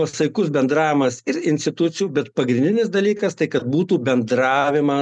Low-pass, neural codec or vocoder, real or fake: 10.8 kHz; none; real